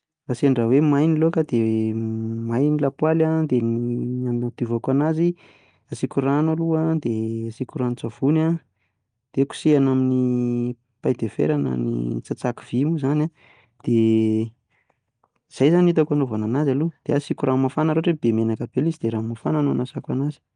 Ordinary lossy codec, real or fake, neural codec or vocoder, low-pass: Opus, 32 kbps; real; none; 9.9 kHz